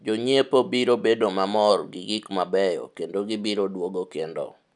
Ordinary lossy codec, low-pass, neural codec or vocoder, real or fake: none; 10.8 kHz; none; real